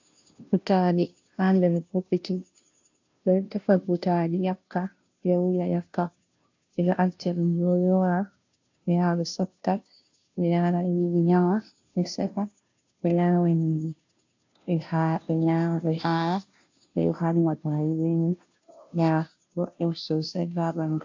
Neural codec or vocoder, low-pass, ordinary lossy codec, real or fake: codec, 16 kHz, 0.5 kbps, FunCodec, trained on Chinese and English, 25 frames a second; 7.2 kHz; AAC, 48 kbps; fake